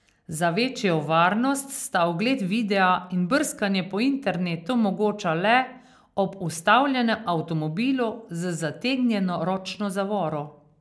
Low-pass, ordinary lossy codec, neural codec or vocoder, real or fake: none; none; none; real